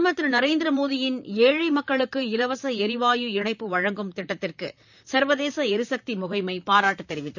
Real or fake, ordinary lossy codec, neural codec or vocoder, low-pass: fake; none; vocoder, 44.1 kHz, 128 mel bands, Pupu-Vocoder; 7.2 kHz